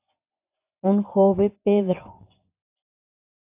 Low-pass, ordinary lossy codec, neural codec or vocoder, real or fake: 3.6 kHz; AAC, 32 kbps; vocoder, 24 kHz, 100 mel bands, Vocos; fake